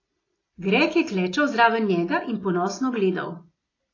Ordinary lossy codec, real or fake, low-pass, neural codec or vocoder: AAC, 32 kbps; real; 7.2 kHz; none